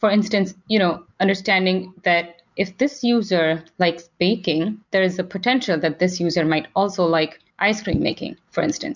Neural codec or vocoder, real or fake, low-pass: none; real; 7.2 kHz